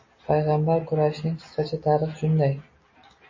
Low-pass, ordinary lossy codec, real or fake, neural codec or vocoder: 7.2 kHz; MP3, 32 kbps; real; none